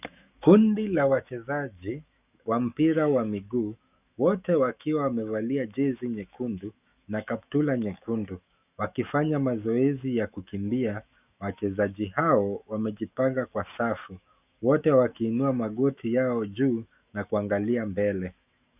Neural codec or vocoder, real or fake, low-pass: autoencoder, 48 kHz, 128 numbers a frame, DAC-VAE, trained on Japanese speech; fake; 3.6 kHz